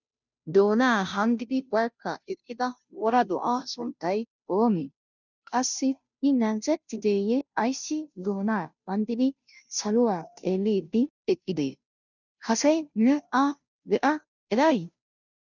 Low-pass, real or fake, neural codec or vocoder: 7.2 kHz; fake; codec, 16 kHz, 0.5 kbps, FunCodec, trained on Chinese and English, 25 frames a second